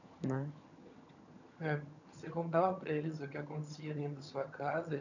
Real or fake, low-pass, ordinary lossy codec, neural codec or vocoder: fake; 7.2 kHz; none; vocoder, 22.05 kHz, 80 mel bands, HiFi-GAN